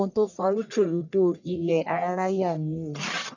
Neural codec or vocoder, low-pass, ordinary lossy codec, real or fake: codec, 44.1 kHz, 1.7 kbps, Pupu-Codec; 7.2 kHz; none; fake